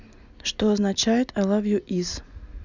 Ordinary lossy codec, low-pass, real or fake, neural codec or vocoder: none; 7.2 kHz; real; none